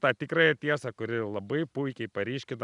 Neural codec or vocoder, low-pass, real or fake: none; 10.8 kHz; real